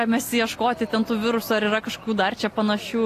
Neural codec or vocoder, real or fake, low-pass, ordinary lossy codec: none; real; 14.4 kHz; AAC, 48 kbps